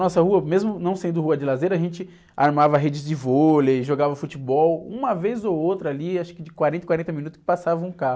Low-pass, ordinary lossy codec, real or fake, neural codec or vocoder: none; none; real; none